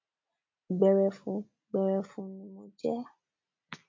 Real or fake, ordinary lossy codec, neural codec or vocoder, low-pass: real; MP3, 48 kbps; none; 7.2 kHz